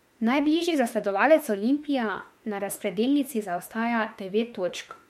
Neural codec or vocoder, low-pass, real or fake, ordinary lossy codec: autoencoder, 48 kHz, 32 numbers a frame, DAC-VAE, trained on Japanese speech; 19.8 kHz; fake; MP3, 64 kbps